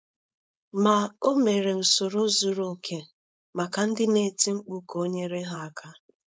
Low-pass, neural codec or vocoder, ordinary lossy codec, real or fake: none; codec, 16 kHz, 4.8 kbps, FACodec; none; fake